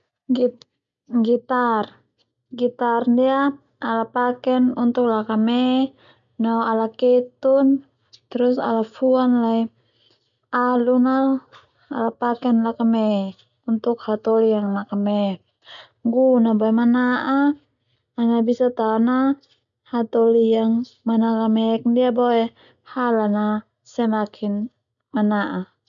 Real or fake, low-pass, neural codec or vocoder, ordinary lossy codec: real; 7.2 kHz; none; none